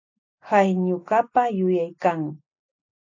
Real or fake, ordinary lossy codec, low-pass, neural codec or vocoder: real; AAC, 48 kbps; 7.2 kHz; none